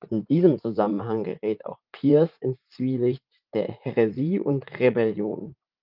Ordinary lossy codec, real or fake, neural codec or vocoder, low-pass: Opus, 24 kbps; fake; vocoder, 44.1 kHz, 80 mel bands, Vocos; 5.4 kHz